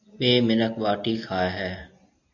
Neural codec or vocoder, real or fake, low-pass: none; real; 7.2 kHz